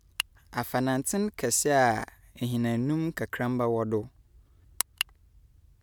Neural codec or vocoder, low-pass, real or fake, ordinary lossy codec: none; none; real; none